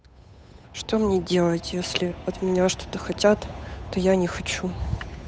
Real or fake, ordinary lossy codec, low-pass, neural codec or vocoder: fake; none; none; codec, 16 kHz, 8 kbps, FunCodec, trained on Chinese and English, 25 frames a second